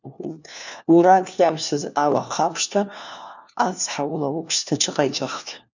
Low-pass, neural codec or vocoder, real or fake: 7.2 kHz; codec, 16 kHz, 1 kbps, FunCodec, trained on LibriTTS, 50 frames a second; fake